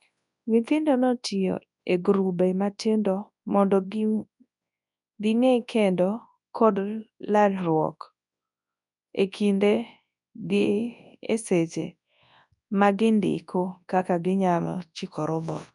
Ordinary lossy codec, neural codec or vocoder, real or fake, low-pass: none; codec, 24 kHz, 0.9 kbps, WavTokenizer, large speech release; fake; 10.8 kHz